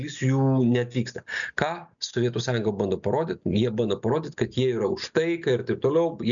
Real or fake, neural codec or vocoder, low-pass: real; none; 7.2 kHz